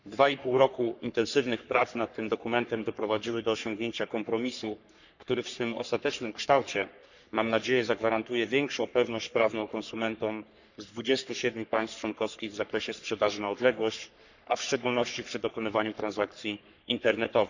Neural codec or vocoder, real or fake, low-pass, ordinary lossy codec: codec, 44.1 kHz, 3.4 kbps, Pupu-Codec; fake; 7.2 kHz; none